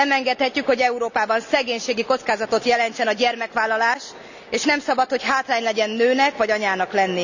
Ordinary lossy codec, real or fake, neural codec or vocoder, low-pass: none; real; none; 7.2 kHz